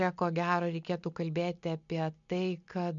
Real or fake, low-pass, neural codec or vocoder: real; 7.2 kHz; none